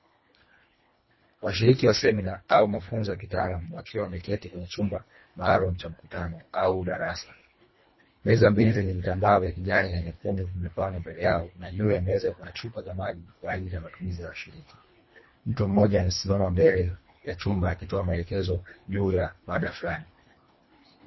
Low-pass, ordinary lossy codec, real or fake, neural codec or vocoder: 7.2 kHz; MP3, 24 kbps; fake; codec, 24 kHz, 1.5 kbps, HILCodec